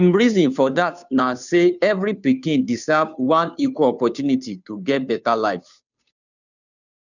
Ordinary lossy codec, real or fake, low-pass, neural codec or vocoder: none; fake; 7.2 kHz; codec, 16 kHz, 2 kbps, FunCodec, trained on Chinese and English, 25 frames a second